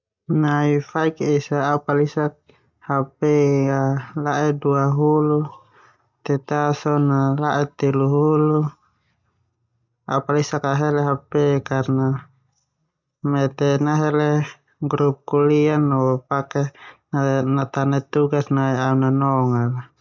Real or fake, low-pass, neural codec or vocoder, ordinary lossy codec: real; 7.2 kHz; none; none